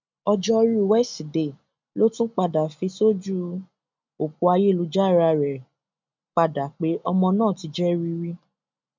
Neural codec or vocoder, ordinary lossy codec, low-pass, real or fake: none; MP3, 64 kbps; 7.2 kHz; real